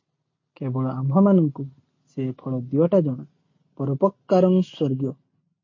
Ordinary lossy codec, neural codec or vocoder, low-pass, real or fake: MP3, 32 kbps; none; 7.2 kHz; real